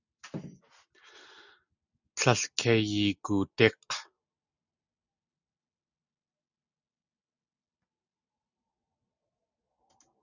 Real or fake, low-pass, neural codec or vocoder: real; 7.2 kHz; none